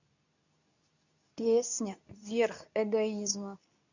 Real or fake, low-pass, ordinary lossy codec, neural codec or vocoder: fake; 7.2 kHz; none; codec, 24 kHz, 0.9 kbps, WavTokenizer, medium speech release version 2